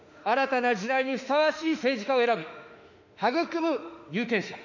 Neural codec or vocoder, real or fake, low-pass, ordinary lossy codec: autoencoder, 48 kHz, 32 numbers a frame, DAC-VAE, trained on Japanese speech; fake; 7.2 kHz; none